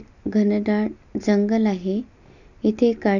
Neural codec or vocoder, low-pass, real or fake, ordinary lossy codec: none; 7.2 kHz; real; none